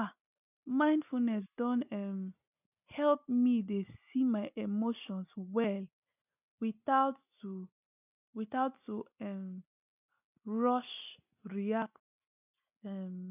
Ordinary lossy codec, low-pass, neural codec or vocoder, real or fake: none; 3.6 kHz; none; real